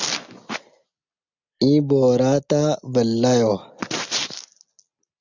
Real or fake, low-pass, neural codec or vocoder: real; 7.2 kHz; none